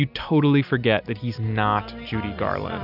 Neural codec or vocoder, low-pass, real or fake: none; 5.4 kHz; real